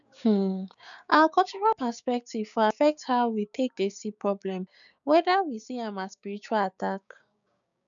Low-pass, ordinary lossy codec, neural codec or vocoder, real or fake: 7.2 kHz; none; codec, 16 kHz, 6 kbps, DAC; fake